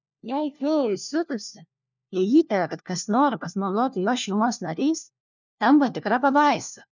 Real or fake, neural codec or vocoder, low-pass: fake; codec, 16 kHz, 1 kbps, FunCodec, trained on LibriTTS, 50 frames a second; 7.2 kHz